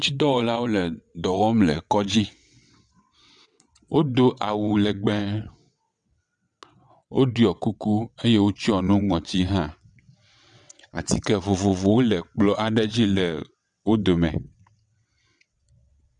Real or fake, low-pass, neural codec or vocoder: fake; 9.9 kHz; vocoder, 22.05 kHz, 80 mel bands, WaveNeXt